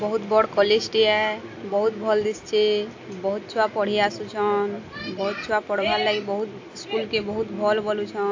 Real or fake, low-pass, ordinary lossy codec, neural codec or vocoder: real; 7.2 kHz; AAC, 48 kbps; none